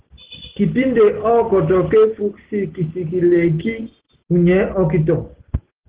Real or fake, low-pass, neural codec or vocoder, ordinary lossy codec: real; 3.6 kHz; none; Opus, 16 kbps